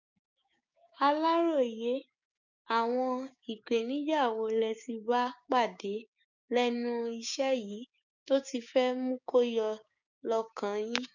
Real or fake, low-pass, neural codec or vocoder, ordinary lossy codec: fake; 7.2 kHz; codec, 16 kHz, 6 kbps, DAC; none